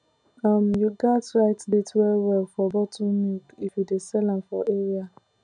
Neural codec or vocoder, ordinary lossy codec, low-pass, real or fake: none; none; 9.9 kHz; real